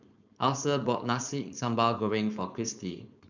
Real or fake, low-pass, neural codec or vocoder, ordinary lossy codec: fake; 7.2 kHz; codec, 16 kHz, 4.8 kbps, FACodec; none